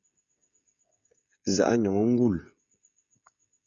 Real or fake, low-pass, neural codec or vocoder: fake; 7.2 kHz; codec, 16 kHz, 16 kbps, FreqCodec, smaller model